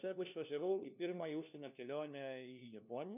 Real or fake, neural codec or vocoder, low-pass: fake; codec, 16 kHz, 1 kbps, FunCodec, trained on LibriTTS, 50 frames a second; 3.6 kHz